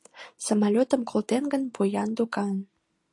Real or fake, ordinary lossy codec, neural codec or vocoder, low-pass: real; AAC, 48 kbps; none; 10.8 kHz